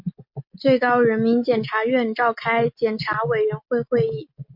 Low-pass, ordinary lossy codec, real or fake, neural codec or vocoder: 5.4 kHz; MP3, 48 kbps; real; none